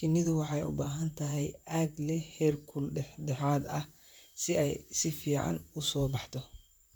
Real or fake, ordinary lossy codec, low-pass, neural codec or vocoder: fake; none; none; vocoder, 44.1 kHz, 128 mel bands, Pupu-Vocoder